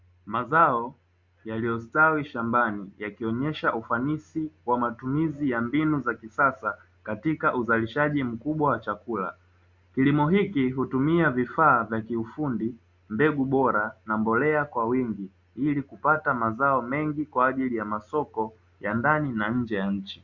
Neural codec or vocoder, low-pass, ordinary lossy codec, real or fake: none; 7.2 kHz; Opus, 64 kbps; real